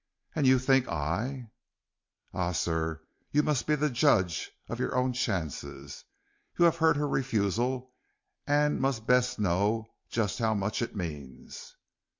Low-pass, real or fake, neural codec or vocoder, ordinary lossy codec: 7.2 kHz; real; none; MP3, 48 kbps